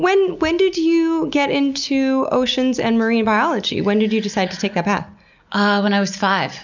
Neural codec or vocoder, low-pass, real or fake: none; 7.2 kHz; real